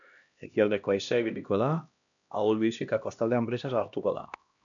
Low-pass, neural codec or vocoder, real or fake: 7.2 kHz; codec, 16 kHz, 1 kbps, X-Codec, HuBERT features, trained on LibriSpeech; fake